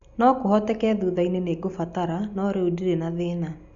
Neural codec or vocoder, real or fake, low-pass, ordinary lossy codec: none; real; 7.2 kHz; none